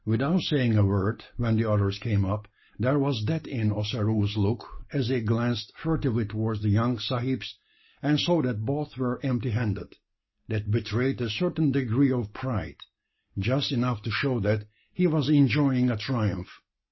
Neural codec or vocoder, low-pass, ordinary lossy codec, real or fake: none; 7.2 kHz; MP3, 24 kbps; real